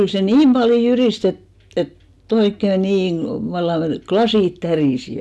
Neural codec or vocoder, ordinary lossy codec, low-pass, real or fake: none; none; none; real